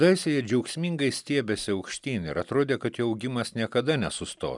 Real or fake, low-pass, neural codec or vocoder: real; 10.8 kHz; none